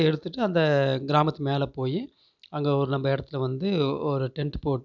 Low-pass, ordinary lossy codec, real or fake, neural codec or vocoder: 7.2 kHz; none; real; none